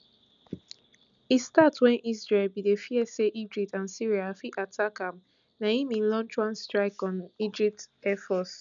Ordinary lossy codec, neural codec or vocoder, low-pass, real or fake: none; none; 7.2 kHz; real